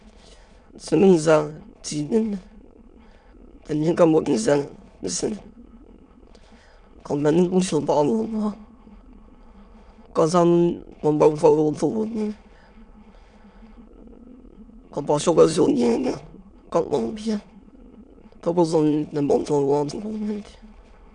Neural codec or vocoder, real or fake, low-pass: autoencoder, 22.05 kHz, a latent of 192 numbers a frame, VITS, trained on many speakers; fake; 9.9 kHz